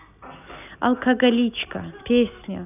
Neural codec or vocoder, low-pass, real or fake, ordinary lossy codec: none; 3.6 kHz; real; none